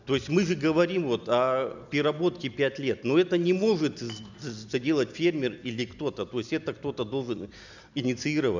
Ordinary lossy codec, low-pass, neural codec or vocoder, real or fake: none; 7.2 kHz; none; real